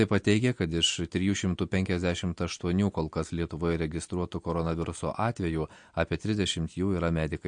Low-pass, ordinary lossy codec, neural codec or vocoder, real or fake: 9.9 kHz; MP3, 48 kbps; none; real